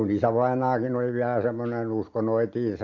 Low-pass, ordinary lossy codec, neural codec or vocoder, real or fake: 7.2 kHz; MP3, 48 kbps; none; real